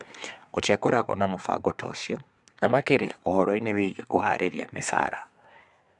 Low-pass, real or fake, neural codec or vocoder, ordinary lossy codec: 10.8 kHz; fake; codec, 24 kHz, 1 kbps, SNAC; none